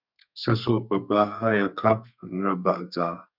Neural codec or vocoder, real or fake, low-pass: codec, 32 kHz, 1.9 kbps, SNAC; fake; 5.4 kHz